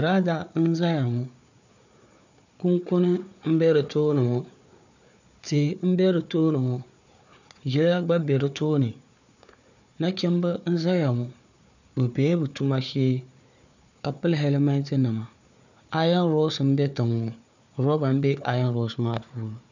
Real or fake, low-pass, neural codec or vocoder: fake; 7.2 kHz; codec, 16 kHz, 4 kbps, FunCodec, trained on Chinese and English, 50 frames a second